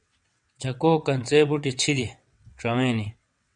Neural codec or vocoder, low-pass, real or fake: vocoder, 22.05 kHz, 80 mel bands, WaveNeXt; 9.9 kHz; fake